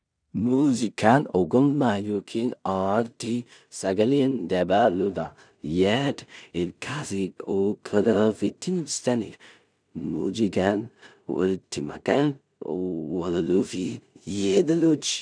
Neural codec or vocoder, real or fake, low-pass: codec, 16 kHz in and 24 kHz out, 0.4 kbps, LongCat-Audio-Codec, two codebook decoder; fake; 9.9 kHz